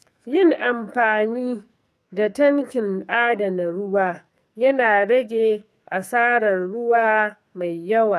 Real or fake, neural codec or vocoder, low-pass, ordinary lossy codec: fake; codec, 44.1 kHz, 2.6 kbps, SNAC; 14.4 kHz; none